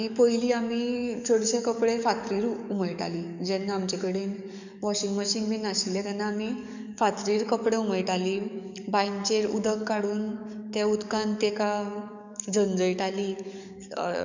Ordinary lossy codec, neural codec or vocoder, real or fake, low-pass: none; codec, 44.1 kHz, 7.8 kbps, DAC; fake; 7.2 kHz